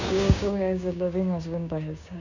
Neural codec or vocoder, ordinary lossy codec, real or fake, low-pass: codec, 16 kHz, 6 kbps, DAC; none; fake; 7.2 kHz